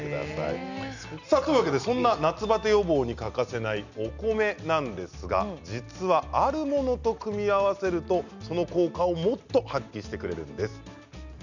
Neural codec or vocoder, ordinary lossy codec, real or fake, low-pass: none; none; real; 7.2 kHz